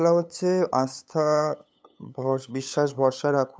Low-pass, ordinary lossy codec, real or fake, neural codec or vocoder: none; none; fake; codec, 16 kHz, 8 kbps, FunCodec, trained on LibriTTS, 25 frames a second